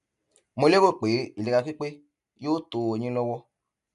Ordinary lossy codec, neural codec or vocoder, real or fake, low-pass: none; none; real; 10.8 kHz